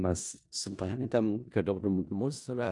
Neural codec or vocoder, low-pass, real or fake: codec, 16 kHz in and 24 kHz out, 0.4 kbps, LongCat-Audio-Codec, four codebook decoder; 10.8 kHz; fake